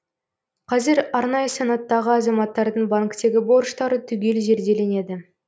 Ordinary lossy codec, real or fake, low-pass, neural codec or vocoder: none; real; none; none